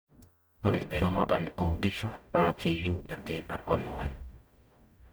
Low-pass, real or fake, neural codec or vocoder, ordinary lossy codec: none; fake; codec, 44.1 kHz, 0.9 kbps, DAC; none